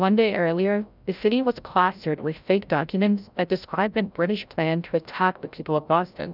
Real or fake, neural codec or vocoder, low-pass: fake; codec, 16 kHz, 0.5 kbps, FreqCodec, larger model; 5.4 kHz